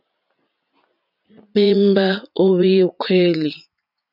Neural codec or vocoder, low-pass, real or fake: vocoder, 44.1 kHz, 80 mel bands, Vocos; 5.4 kHz; fake